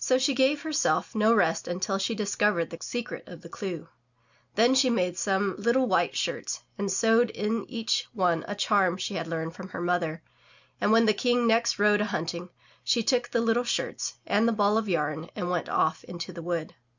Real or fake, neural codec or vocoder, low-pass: real; none; 7.2 kHz